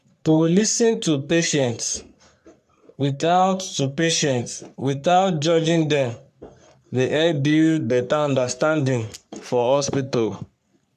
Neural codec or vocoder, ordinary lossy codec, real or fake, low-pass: codec, 44.1 kHz, 3.4 kbps, Pupu-Codec; none; fake; 14.4 kHz